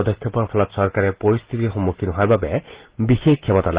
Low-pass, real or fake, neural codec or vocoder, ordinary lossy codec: 3.6 kHz; real; none; Opus, 16 kbps